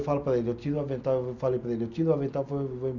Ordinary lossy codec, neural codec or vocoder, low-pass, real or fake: none; none; 7.2 kHz; real